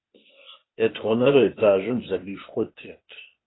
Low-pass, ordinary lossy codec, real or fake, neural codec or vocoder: 7.2 kHz; AAC, 16 kbps; fake; codec, 16 kHz, 0.8 kbps, ZipCodec